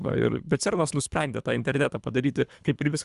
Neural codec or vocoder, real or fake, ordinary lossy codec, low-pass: codec, 24 kHz, 3 kbps, HILCodec; fake; Opus, 64 kbps; 10.8 kHz